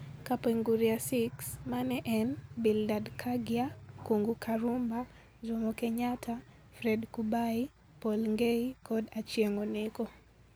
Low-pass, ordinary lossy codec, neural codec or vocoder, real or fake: none; none; none; real